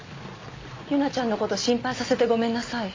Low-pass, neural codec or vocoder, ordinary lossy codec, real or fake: 7.2 kHz; none; MP3, 48 kbps; real